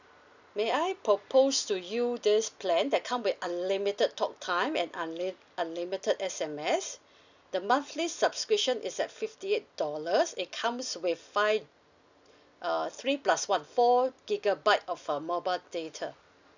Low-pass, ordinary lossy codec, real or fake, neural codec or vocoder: 7.2 kHz; none; real; none